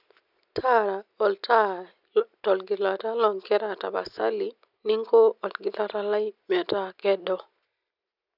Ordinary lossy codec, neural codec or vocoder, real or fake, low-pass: none; none; real; 5.4 kHz